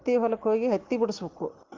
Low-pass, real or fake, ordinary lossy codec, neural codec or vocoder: 7.2 kHz; real; Opus, 16 kbps; none